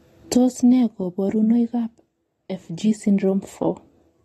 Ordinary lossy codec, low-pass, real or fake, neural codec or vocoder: AAC, 32 kbps; 19.8 kHz; real; none